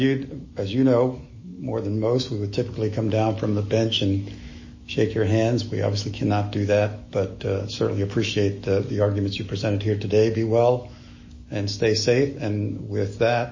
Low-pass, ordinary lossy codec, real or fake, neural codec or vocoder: 7.2 kHz; MP3, 32 kbps; fake; autoencoder, 48 kHz, 128 numbers a frame, DAC-VAE, trained on Japanese speech